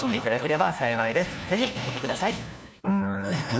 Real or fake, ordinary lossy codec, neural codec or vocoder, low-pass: fake; none; codec, 16 kHz, 1 kbps, FunCodec, trained on LibriTTS, 50 frames a second; none